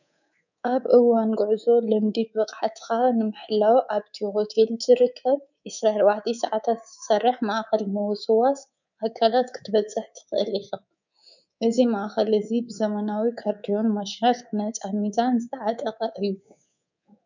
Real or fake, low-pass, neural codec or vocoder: fake; 7.2 kHz; codec, 24 kHz, 3.1 kbps, DualCodec